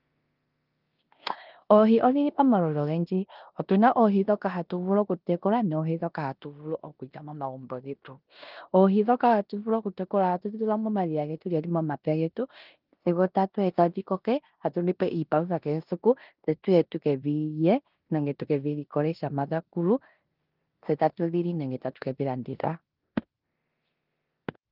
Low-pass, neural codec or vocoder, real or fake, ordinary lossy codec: 5.4 kHz; codec, 16 kHz in and 24 kHz out, 0.9 kbps, LongCat-Audio-Codec, four codebook decoder; fake; Opus, 32 kbps